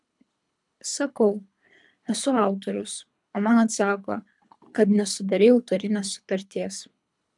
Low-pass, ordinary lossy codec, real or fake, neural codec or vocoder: 10.8 kHz; MP3, 96 kbps; fake; codec, 24 kHz, 3 kbps, HILCodec